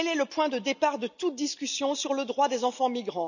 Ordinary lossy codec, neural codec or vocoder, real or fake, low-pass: none; none; real; 7.2 kHz